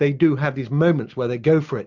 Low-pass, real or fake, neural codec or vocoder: 7.2 kHz; real; none